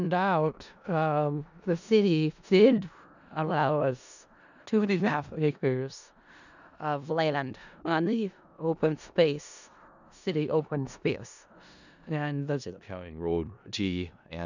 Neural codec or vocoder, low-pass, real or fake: codec, 16 kHz in and 24 kHz out, 0.4 kbps, LongCat-Audio-Codec, four codebook decoder; 7.2 kHz; fake